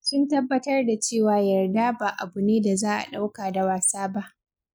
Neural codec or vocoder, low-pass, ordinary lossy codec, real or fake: none; none; none; real